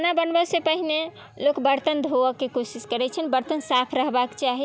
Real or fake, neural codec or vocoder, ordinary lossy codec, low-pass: real; none; none; none